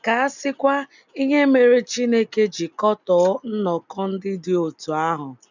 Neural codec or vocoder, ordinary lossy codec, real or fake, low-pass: none; none; real; 7.2 kHz